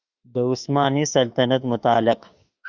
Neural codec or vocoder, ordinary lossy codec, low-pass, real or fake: autoencoder, 48 kHz, 32 numbers a frame, DAC-VAE, trained on Japanese speech; Opus, 64 kbps; 7.2 kHz; fake